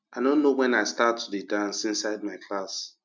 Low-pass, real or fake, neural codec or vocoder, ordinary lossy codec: 7.2 kHz; real; none; MP3, 64 kbps